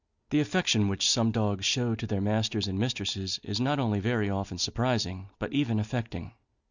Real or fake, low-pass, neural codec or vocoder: real; 7.2 kHz; none